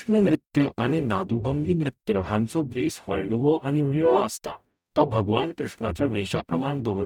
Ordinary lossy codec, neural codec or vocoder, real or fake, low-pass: none; codec, 44.1 kHz, 0.9 kbps, DAC; fake; 19.8 kHz